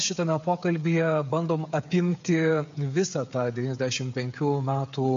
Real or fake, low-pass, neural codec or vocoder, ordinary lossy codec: fake; 7.2 kHz; codec, 16 kHz, 8 kbps, FreqCodec, smaller model; MP3, 48 kbps